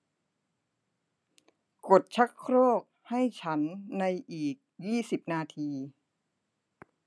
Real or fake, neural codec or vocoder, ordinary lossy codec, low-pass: real; none; none; none